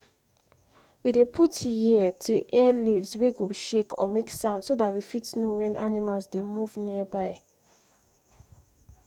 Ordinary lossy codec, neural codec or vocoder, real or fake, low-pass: none; codec, 44.1 kHz, 2.6 kbps, DAC; fake; 19.8 kHz